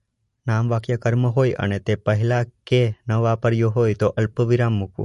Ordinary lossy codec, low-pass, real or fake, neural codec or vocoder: MP3, 48 kbps; 14.4 kHz; real; none